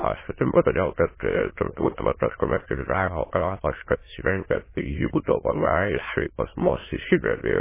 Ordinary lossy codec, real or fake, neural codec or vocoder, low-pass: MP3, 16 kbps; fake; autoencoder, 22.05 kHz, a latent of 192 numbers a frame, VITS, trained on many speakers; 3.6 kHz